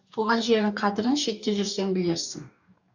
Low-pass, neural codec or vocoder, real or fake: 7.2 kHz; codec, 44.1 kHz, 2.6 kbps, DAC; fake